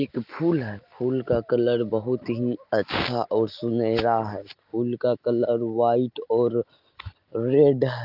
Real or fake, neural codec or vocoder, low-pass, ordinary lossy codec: real; none; 5.4 kHz; Opus, 32 kbps